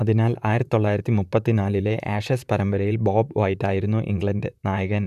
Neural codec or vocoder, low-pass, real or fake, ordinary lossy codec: vocoder, 44.1 kHz, 128 mel bands, Pupu-Vocoder; 14.4 kHz; fake; none